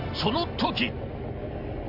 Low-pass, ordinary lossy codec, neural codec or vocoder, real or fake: 5.4 kHz; none; none; real